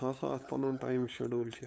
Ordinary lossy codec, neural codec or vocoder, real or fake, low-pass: none; codec, 16 kHz, 8 kbps, FunCodec, trained on LibriTTS, 25 frames a second; fake; none